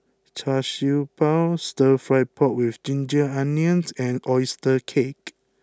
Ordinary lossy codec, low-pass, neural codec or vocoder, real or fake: none; none; none; real